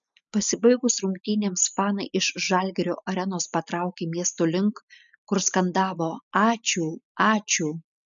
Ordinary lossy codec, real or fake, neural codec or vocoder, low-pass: MP3, 96 kbps; real; none; 7.2 kHz